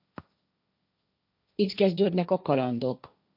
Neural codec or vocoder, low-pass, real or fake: codec, 16 kHz, 1.1 kbps, Voila-Tokenizer; 5.4 kHz; fake